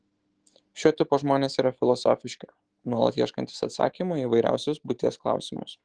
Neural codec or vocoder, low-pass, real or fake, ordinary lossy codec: autoencoder, 48 kHz, 128 numbers a frame, DAC-VAE, trained on Japanese speech; 9.9 kHz; fake; Opus, 16 kbps